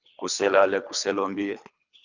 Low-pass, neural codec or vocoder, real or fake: 7.2 kHz; codec, 24 kHz, 3 kbps, HILCodec; fake